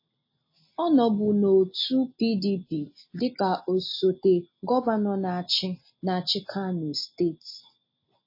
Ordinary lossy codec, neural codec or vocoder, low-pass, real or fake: MP3, 24 kbps; none; 5.4 kHz; real